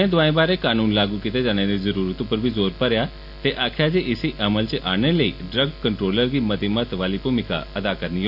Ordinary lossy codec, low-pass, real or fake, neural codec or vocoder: AAC, 48 kbps; 5.4 kHz; real; none